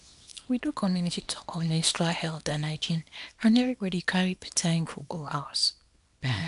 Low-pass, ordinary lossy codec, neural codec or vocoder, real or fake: 10.8 kHz; none; codec, 24 kHz, 0.9 kbps, WavTokenizer, small release; fake